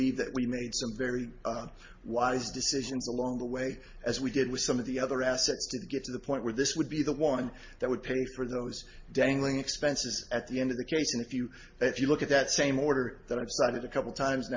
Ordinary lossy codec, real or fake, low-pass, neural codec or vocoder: MP3, 32 kbps; real; 7.2 kHz; none